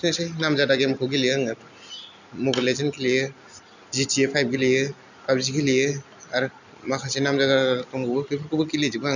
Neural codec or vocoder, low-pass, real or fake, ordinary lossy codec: none; 7.2 kHz; real; none